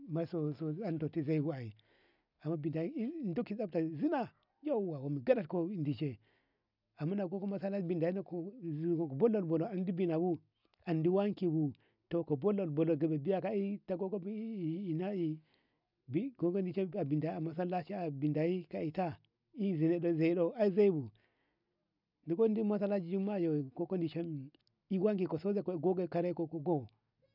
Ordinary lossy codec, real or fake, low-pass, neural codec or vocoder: none; real; 5.4 kHz; none